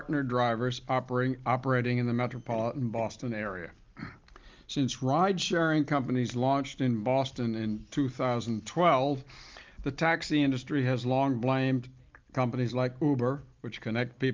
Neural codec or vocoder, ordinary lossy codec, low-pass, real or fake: none; Opus, 24 kbps; 7.2 kHz; real